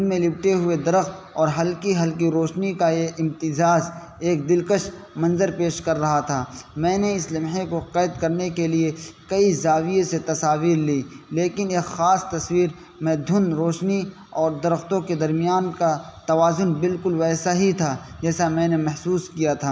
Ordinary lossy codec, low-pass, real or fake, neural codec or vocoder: none; none; real; none